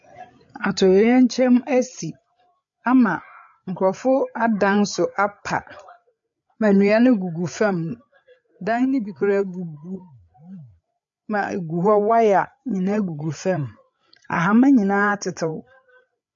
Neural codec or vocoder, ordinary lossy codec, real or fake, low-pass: codec, 16 kHz, 8 kbps, FreqCodec, larger model; MP3, 48 kbps; fake; 7.2 kHz